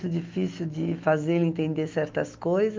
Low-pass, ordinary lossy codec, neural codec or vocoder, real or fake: 7.2 kHz; Opus, 32 kbps; autoencoder, 48 kHz, 128 numbers a frame, DAC-VAE, trained on Japanese speech; fake